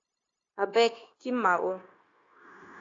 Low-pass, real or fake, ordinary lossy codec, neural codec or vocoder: 7.2 kHz; fake; AAC, 32 kbps; codec, 16 kHz, 0.9 kbps, LongCat-Audio-Codec